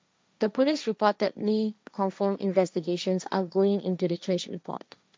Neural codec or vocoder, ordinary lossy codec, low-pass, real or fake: codec, 16 kHz, 1.1 kbps, Voila-Tokenizer; none; none; fake